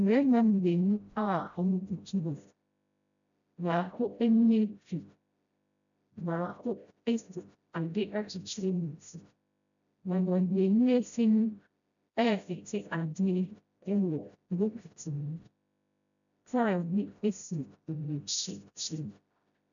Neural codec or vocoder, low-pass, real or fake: codec, 16 kHz, 0.5 kbps, FreqCodec, smaller model; 7.2 kHz; fake